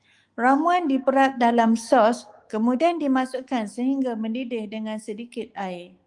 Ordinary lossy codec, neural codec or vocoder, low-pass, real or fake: Opus, 24 kbps; autoencoder, 48 kHz, 128 numbers a frame, DAC-VAE, trained on Japanese speech; 10.8 kHz; fake